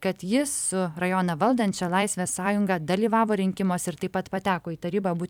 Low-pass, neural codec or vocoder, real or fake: 19.8 kHz; vocoder, 44.1 kHz, 128 mel bands every 256 samples, BigVGAN v2; fake